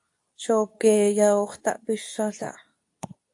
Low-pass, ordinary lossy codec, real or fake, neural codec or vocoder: 10.8 kHz; AAC, 64 kbps; fake; codec, 24 kHz, 0.9 kbps, WavTokenizer, medium speech release version 2